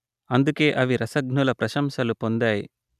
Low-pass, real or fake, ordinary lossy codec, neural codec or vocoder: 14.4 kHz; real; none; none